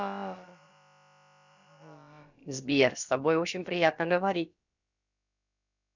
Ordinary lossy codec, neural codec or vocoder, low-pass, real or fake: none; codec, 16 kHz, about 1 kbps, DyCAST, with the encoder's durations; 7.2 kHz; fake